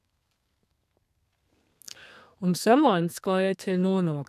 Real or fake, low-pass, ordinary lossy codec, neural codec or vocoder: fake; 14.4 kHz; none; codec, 32 kHz, 1.9 kbps, SNAC